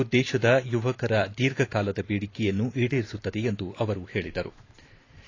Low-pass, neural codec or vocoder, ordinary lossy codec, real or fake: 7.2 kHz; vocoder, 44.1 kHz, 128 mel bands every 512 samples, BigVGAN v2; AAC, 32 kbps; fake